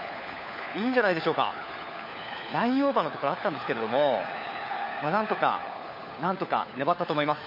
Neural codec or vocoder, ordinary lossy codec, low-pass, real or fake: codec, 16 kHz, 4 kbps, FunCodec, trained on LibriTTS, 50 frames a second; MP3, 32 kbps; 5.4 kHz; fake